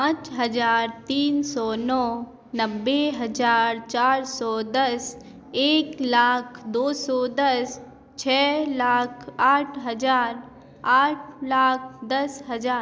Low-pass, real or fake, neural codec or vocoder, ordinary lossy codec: none; real; none; none